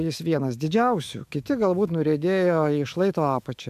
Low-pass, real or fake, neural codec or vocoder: 14.4 kHz; fake; autoencoder, 48 kHz, 128 numbers a frame, DAC-VAE, trained on Japanese speech